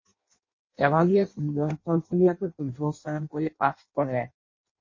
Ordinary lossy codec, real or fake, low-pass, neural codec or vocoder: MP3, 32 kbps; fake; 7.2 kHz; codec, 16 kHz in and 24 kHz out, 0.6 kbps, FireRedTTS-2 codec